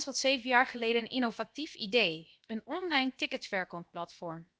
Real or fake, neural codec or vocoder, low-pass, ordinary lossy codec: fake; codec, 16 kHz, 0.7 kbps, FocalCodec; none; none